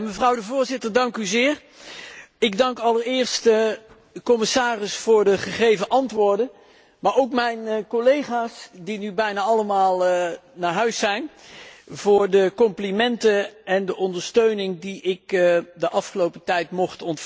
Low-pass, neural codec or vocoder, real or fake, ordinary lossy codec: none; none; real; none